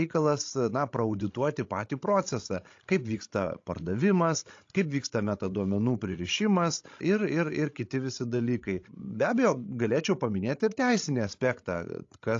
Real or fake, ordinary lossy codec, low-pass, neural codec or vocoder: fake; AAC, 48 kbps; 7.2 kHz; codec, 16 kHz, 16 kbps, FreqCodec, larger model